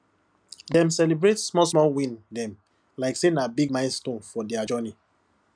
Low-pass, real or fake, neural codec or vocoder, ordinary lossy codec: 9.9 kHz; real; none; none